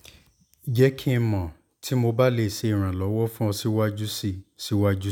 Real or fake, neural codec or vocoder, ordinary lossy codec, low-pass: real; none; none; none